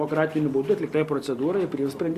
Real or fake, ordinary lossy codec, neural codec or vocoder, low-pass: real; Opus, 64 kbps; none; 14.4 kHz